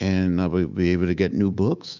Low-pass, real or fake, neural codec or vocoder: 7.2 kHz; fake; codec, 24 kHz, 3.1 kbps, DualCodec